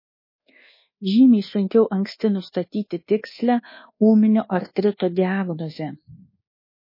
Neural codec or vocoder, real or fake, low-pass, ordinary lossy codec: codec, 24 kHz, 1.2 kbps, DualCodec; fake; 5.4 kHz; MP3, 24 kbps